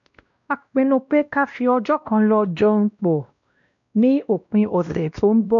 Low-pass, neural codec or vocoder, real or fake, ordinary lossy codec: 7.2 kHz; codec, 16 kHz, 1 kbps, X-Codec, WavLM features, trained on Multilingual LibriSpeech; fake; MP3, 64 kbps